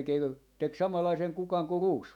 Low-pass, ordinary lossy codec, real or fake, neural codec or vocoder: 19.8 kHz; none; fake; autoencoder, 48 kHz, 128 numbers a frame, DAC-VAE, trained on Japanese speech